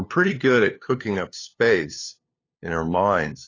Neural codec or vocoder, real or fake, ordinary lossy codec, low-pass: codec, 16 kHz, 2 kbps, FunCodec, trained on LibriTTS, 25 frames a second; fake; AAC, 32 kbps; 7.2 kHz